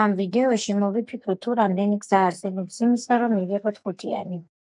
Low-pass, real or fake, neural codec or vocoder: 10.8 kHz; fake; codec, 44.1 kHz, 2.6 kbps, SNAC